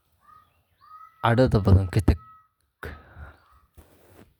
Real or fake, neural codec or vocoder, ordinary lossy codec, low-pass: real; none; none; 19.8 kHz